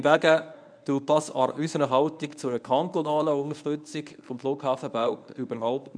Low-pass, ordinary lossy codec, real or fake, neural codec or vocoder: 9.9 kHz; none; fake; codec, 24 kHz, 0.9 kbps, WavTokenizer, medium speech release version 1